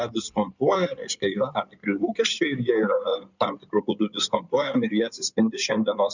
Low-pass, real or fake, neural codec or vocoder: 7.2 kHz; fake; codec, 16 kHz in and 24 kHz out, 2.2 kbps, FireRedTTS-2 codec